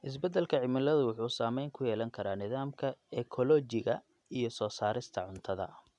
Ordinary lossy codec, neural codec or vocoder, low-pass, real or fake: none; none; 10.8 kHz; real